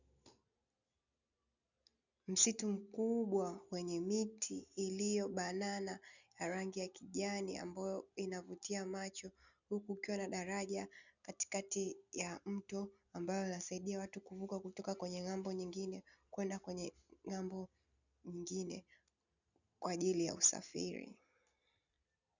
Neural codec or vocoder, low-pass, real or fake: none; 7.2 kHz; real